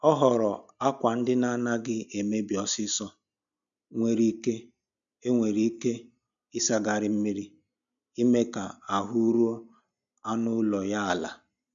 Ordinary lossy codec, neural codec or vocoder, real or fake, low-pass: none; none; real; 7.2 kHz